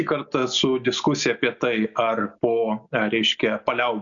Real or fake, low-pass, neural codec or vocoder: real; 7.2 kHz; none